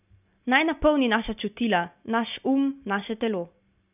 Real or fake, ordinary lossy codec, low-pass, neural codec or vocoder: real; none; 3.6 kHz; none